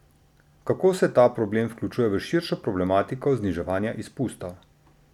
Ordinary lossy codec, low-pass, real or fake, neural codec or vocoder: none; 19.8 kHz; real; none